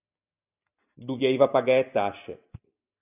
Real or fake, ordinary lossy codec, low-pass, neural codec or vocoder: real; AAC, 32 kbps; 3.6 kHz; none